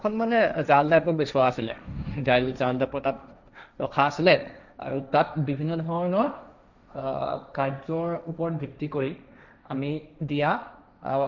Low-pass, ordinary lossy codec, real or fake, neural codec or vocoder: 7.2 kHz; none; fake; codec, 16 kHz, 1.1 kbps, Voila-Tokenizer